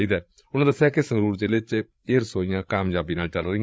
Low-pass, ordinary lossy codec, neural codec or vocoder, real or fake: none; none; codec, 16 kHz, 8 kbps, FreqCodec, larger model; fake